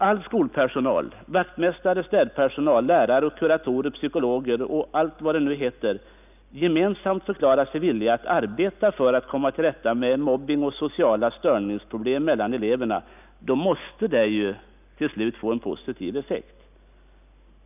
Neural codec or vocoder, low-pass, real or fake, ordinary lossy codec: none; 3.6 kHz; real; none